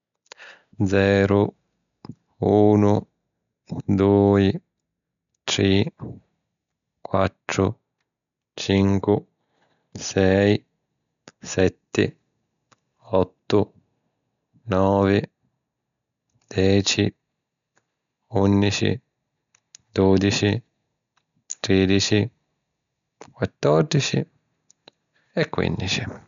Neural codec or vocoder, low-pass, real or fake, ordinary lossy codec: none; 7.2 kHz; real; none